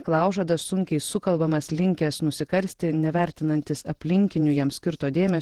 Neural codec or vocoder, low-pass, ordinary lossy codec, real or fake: vocoder, 48 kHz, 128 mel bands, Vocos; 19.8 kHz; Opus, 16 kbps; fake